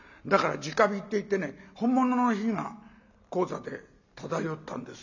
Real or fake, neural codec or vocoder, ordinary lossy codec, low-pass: real; none; none; 7.2 kHz